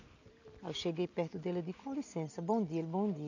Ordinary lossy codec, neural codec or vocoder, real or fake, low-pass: none; none; real; 7.2 kHz